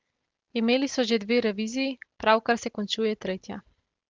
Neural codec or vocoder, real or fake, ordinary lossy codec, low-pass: none; real; Opus, 16 kbps; 7.2 kHz